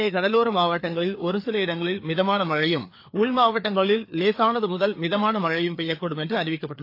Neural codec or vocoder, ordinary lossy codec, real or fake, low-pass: codec, 16 kHz, 4 kbps, FreqCodec, larger model; AAC, 32 kbps; fake; 5.4 kHz